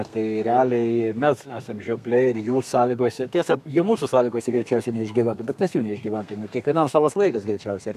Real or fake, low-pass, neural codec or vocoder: fake; 14.4 kHz; codec, 32 kHz, 1.9 kbps, SNAC